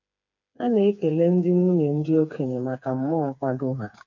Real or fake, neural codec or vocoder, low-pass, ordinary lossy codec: fake; codec, 16 kHz, 4 kbps, FreqCodec, smaller model; 7.2 kHz; none